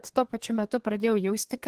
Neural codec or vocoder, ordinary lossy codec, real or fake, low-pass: codec, 32 kHz, 1.9 kbps, SNAC; Opus, 16 kbps; fake; 14.4 kHz